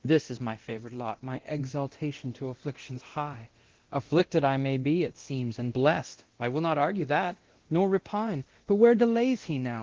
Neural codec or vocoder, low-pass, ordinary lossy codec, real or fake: codec, 24 kHz, 0.9 kbps, DualCodec; 7.2 kHz; Opus, 16 kbps; fake